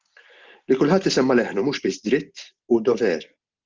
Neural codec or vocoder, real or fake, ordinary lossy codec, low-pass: none; real; Opus, 32 kbps; 7.2 kHz